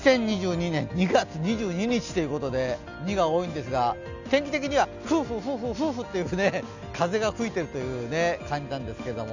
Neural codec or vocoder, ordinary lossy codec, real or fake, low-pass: none; none; real; 7.2 kHz